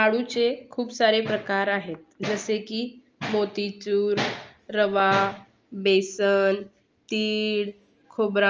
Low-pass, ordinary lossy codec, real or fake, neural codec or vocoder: 7.2 kHz; Opus, 24 kbps; real; none